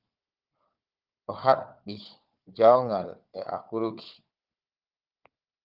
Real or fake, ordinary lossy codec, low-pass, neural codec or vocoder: fake; Opus, 32 kbps; 5.4 kHz; codec, 16 kHz, 4 kbps, FunCodec, trained on Chinese and English, 50 frames a second